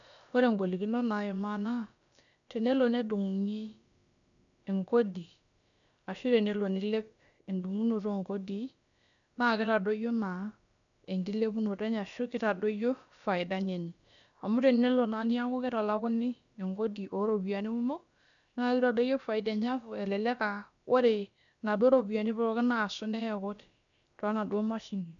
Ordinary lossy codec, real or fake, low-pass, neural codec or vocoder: none; fake; 7.2 kHz; codec, 16 kHz, about 1 kbps, DyCAST, with the encoder's durations